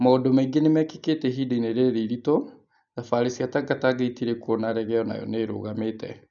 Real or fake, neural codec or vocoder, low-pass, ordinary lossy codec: real; none; 7.2 kHz; none